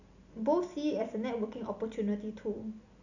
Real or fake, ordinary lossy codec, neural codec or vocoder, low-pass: real; none; none; 7.2 kHz